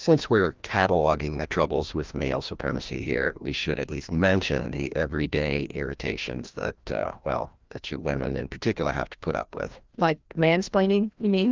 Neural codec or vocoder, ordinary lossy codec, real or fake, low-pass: codec, 16 kHz, 1 kbps, FreqCodec, larger model; Opus, 24 kbps; fake; 7.2 kHz